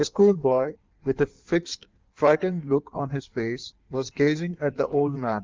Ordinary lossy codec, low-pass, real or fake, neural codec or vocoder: Opus, 32 kbps; 7.2 kHz; fake; codec, 16 kHz in and 24 kHz out, 1.1 kbps, FireRedTTS-2 codec